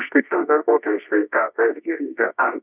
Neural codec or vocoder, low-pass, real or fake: codec, 24 kHz, 0.9 kbps, WavTokenizer, medium music audio release; 3.6 kHz; fake